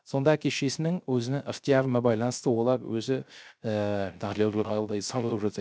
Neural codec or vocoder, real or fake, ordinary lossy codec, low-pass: codec, 16 kHz, 0.3 kbps, FocalCodec; fake; none; none